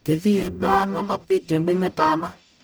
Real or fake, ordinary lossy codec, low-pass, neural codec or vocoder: fake; none; none; codec, 44.1 kHz, 0.9 kbps, DAC